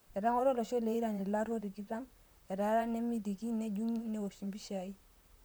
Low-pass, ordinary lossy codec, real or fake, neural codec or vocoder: none; none; fake; vocoder, 44.1 kHz, 128 mel bands, Pupu-Vocoder